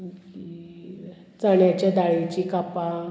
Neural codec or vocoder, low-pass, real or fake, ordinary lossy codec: none; none; real; none